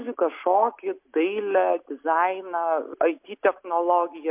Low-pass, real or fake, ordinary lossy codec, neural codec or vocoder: 3.6 kHz; real; MP3, 32 kbps; none